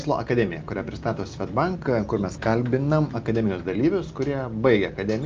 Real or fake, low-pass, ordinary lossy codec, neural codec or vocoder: real; 7.2 kHz; Opus, 24 kbps; none